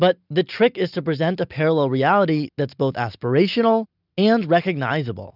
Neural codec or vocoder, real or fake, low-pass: none; real; 5.4 kHz